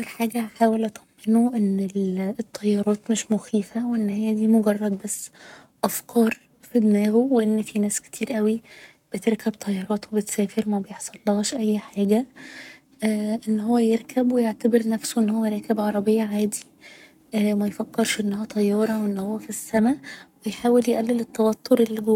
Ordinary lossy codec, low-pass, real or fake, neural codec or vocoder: none; 19.8 kHz; fake; codec, 44.1 kHz, 7.8 kbps, Pupu-Codec